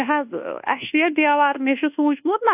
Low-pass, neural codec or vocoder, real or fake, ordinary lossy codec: 3.6 kHz; codec, 24 kHz, 1.2 kbps, DualCodec; fake; AAC, 32 kbps